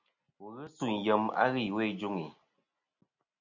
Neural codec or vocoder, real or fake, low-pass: none; real; 7.2 kHz